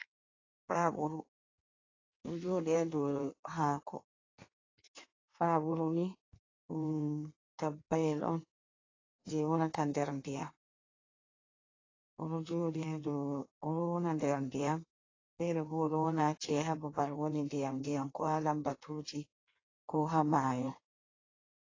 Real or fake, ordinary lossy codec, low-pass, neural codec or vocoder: fake; AAC, 32 kbps; 7.2 kHz; codec, 16 kHz in and 24 kHz out, 1.1 kbps, FireRedTTS-2 codec